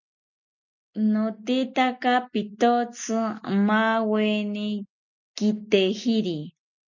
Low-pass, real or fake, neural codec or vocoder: 7.2 kHz; real; none